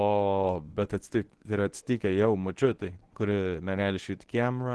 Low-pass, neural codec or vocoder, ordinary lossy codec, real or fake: 10.8 kHz; codec, 24 kHz, 0.9 kbps, WavTokenizer, medium speech release version 1; Opus, 16 kbps; fake